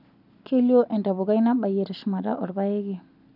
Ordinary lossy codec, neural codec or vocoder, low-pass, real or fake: none; none; 5.4 kHz; real